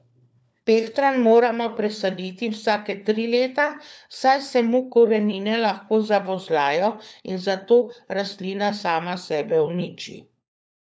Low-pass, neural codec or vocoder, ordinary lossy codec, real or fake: none; codec, 16 kHz, 4 kbps, FunCodec, trained on LibriTTS, 50 frames a second; none; fake